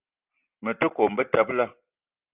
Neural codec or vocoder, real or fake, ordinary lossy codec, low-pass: none; real; Opus, 24 kbps; 3.6 kHz